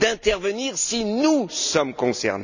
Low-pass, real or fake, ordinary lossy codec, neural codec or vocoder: 7.2 kHz; real; none; none